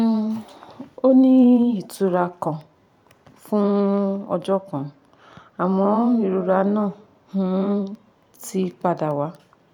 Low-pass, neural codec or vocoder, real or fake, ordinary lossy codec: 19.8 kHz; vocoder, 44.1 kHz, 128 mel bands every 512 samples, BigVGAN v2; fake; none